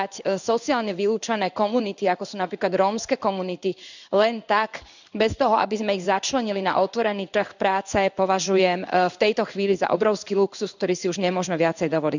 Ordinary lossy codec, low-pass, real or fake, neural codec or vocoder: none; 7.2 kHz; fake; codec, 16 kHz in and 24 kHz out, 1 kbps, XY-Tokenizer